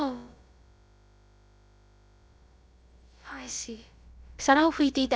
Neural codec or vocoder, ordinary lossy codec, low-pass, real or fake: codec, 16 kHz, about 1 kbps, DyCAST, with the encoder's durations; none; none; fake